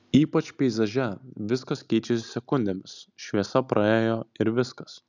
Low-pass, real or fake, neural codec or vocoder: 7.2 kHz; real; none